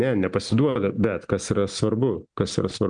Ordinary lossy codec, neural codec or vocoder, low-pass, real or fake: MP3, 96 kbps; none; 9.9 kHz; real